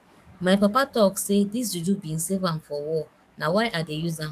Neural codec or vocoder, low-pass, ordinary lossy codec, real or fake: codec, 44.1 kHz, 7.8 kbps, DAC; 14.4 kHz; AAC, 96 kbps; fake